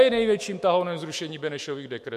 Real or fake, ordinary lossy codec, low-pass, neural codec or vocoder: fake; MP3, 64 kbps; 14.4 kHz; autoencoder, 48 kHz, 128 numbers a frame, DAC-VAE, trained on Japanese speech